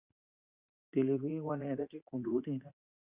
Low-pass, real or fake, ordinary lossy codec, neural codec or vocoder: 3.6 kHz; fake; Opus, 64 kbps; vocoder, 44.1 kHz, 80 mel bands, Vocos